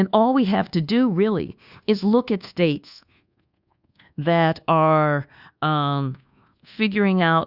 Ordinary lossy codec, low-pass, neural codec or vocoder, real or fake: Opus, 64 kbps; 5.4 kHz; codec, 24 kHz, 1.2 kbps, DualCodec; fake